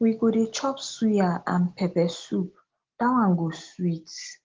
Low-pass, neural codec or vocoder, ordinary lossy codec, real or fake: 7.2 kHz; none; Opus, 16 kbps; real